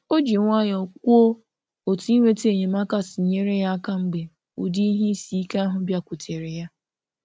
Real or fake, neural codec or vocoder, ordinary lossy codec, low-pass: real; none; none; none